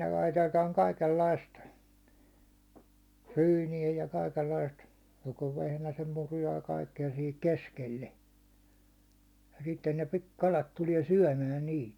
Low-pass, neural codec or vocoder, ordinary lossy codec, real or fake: 19.8 kHz; none; none; real